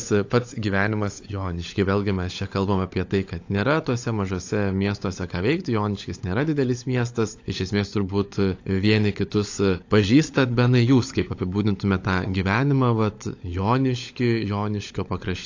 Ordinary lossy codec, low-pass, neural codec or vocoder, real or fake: AAC, 48 kbps; 7.2 kHz; codec, 16 kHz, 16 kbps, FunCodec, trained on LibriTTS, 50 frames a second; fake